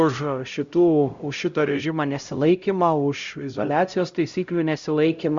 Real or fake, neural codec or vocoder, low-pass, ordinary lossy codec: fake; codec, 16 kHz, 0.5 kbps, X-Codec, HuBERT features, trained on LibriSpeech; 7.2 kHz; Opus, 64 kbps